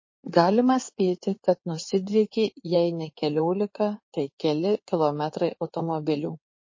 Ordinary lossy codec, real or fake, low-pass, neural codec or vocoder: MP3, 32 kbps; fake; 7.2 kHz; codec, 16 kHz in and 24 kHz out, 2.2 kbps, FireRedTTS-2 codec